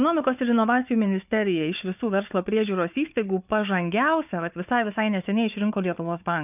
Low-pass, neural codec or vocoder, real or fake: 3.6 kHz; codec, 16 kHz, 4 kbps, FunCodec, trained on Chinese and English, 50 frames a second; fake